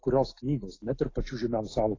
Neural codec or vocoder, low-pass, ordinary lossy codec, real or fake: none; 7.2 kHz; AAC, 32 kbps; real